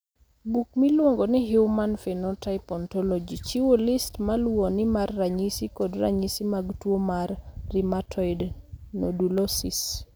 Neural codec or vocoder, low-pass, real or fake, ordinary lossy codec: none; none; real; none